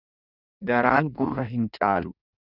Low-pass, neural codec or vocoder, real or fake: 5.4 kHz; codec, 16 kHz in and 24 kHz out, 1.1 kbps, FireRedTTS-2 codec; fake